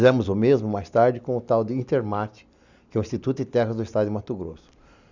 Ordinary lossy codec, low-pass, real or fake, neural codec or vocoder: none; 7.2 kHz; real; none